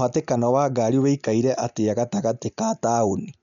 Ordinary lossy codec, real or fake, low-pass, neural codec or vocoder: none; real; 7.2 kHz; none